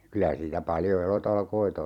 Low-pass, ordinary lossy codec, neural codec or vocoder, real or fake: 19.8 kHz; none; vocoder, 44.1 kHz, 128 mel bands every 256 samples, BigVGAN v2; fake